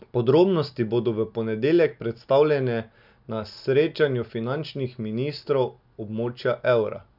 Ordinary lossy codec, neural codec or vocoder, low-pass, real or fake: none; none; 5.4 kHz; real